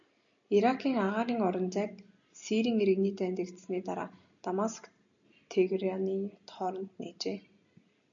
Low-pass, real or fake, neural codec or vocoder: 7.2 kHz; real; none